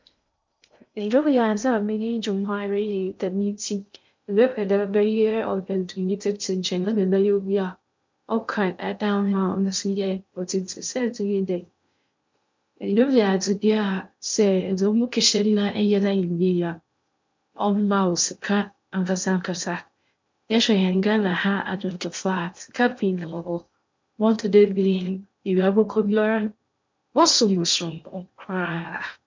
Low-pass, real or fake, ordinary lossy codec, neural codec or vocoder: 7.2 kHz; fake; MP3, 64 kbps; codec, 16 kHz in and 24 kHz out, 0.6 kbps, FocalCodec, streaming, 2048 codes